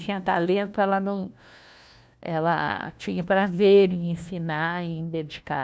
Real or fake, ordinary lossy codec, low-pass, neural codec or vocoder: fake; none; none; codec, 16 kHz, 1 kbps, FunCodec, trained on LibriTTS, 50 frames a second